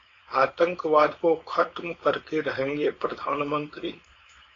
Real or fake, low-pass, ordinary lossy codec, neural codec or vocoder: fake; 7.2 kHz; AAC, 32 kbps; codec, 16 kHz, 4.8 kbps, FACodec